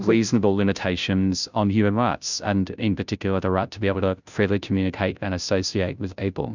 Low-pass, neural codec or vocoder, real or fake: 7.2 kHz; codec, 16 kHz, 0.5 kbps, FunCodec, trained on Chinese and English, 25 frames a second; fake